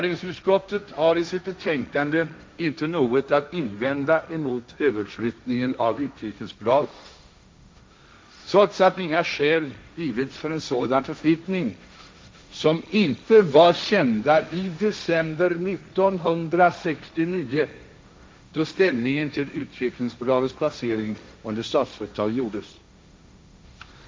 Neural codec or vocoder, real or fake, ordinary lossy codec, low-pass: codec, 16 kHz, 1.1 kbps, Voila-Tokenizer; fake; none; none